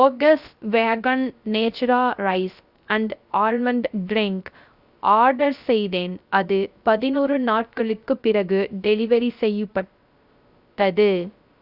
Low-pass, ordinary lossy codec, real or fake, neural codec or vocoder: 5.4 kHz; Opus, 64 kbps; fake; codec, 16 kHz, 0.3 kbps, FocalCodec